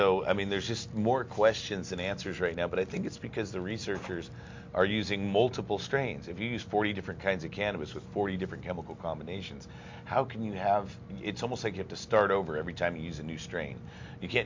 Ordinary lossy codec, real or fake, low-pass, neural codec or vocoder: MP3, 48 kbps; real; 7.2 kHz; none